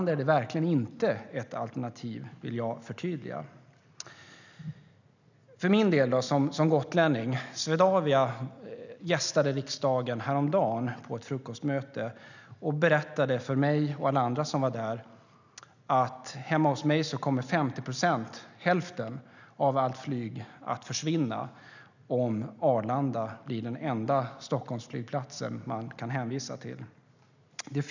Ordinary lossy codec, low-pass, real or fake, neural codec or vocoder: none; 7.2 kHz; real; none